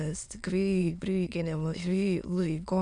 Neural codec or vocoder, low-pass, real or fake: autoencoder, 22.05 kHz, a latent of 192 numbers a frame, VITS, trained on many speakers; 9.9 kHz; fake